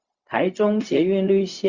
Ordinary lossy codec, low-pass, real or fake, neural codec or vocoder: Opus, 64 kbps; 7.2 kHz; fake; codec, 16 kHz, 0.4 kbps, LongCat-Audio-Codec